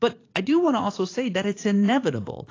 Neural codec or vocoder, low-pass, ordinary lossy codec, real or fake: vocoder, 44.1 kHz, 80 mel bands, Vocos; 7.2 kHz; AAC, 32 kbps; fake